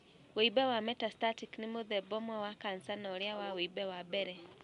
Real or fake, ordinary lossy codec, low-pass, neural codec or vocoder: real; none; 10.8 kHz; none